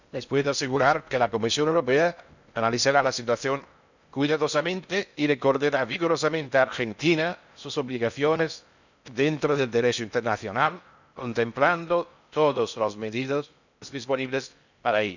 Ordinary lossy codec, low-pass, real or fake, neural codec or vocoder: none; 7.2 kHz; fake; codec, 16 kHz in and 24 kHz out, 0.6 kbps, FocalCodec, streaming, 2048 codes